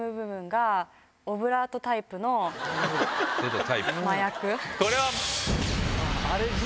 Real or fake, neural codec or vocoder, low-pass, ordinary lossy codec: real; none; none; none